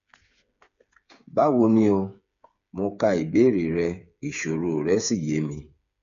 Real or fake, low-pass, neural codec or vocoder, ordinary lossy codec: fake; 7.2 kHz; codec, 16 kHz, 8 kbps, FreqCodec, smaller model; none